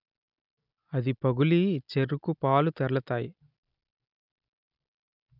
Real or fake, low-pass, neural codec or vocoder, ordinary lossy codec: real; 5.4 kHz; none; none